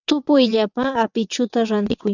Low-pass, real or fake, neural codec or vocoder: 7.2 kHz; fake; vocoder, 22.05 kHz, 80 mel bands, WaveNeXt